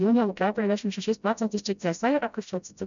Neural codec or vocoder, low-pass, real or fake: codec, 16 kHz, 0.5 kbps, FreqCodec, smaller model; 7.2 kHz; fake